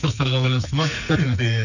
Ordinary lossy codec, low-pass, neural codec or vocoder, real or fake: none; 7.2 kHz; codec, 44.1 kHz, 2.6 kbps, SNAC; fake